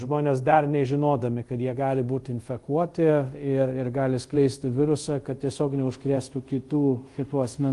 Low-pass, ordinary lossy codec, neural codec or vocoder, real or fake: 10.8 kHz; Opus, 24 kbps; codec, 24 kHz, 0.5 kbps, DualCodec; fake